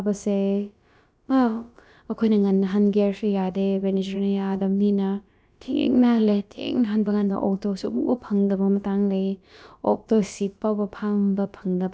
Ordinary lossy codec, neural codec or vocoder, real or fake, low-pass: none; codec, 16 kHz, about 1 kbps, DyCAST, with the encoder's durations; fake; none